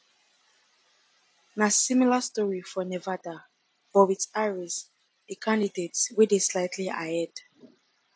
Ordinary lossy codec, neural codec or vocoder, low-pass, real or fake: none; none; none; real